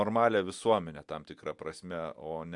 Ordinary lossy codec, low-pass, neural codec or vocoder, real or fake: MP3, 96 kbps; 10.8 kHz; none; real